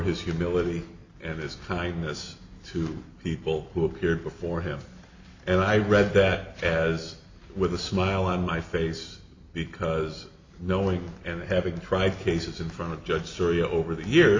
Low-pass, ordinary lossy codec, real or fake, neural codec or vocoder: 7.2 kHz; MP3, 48 kbps; real; none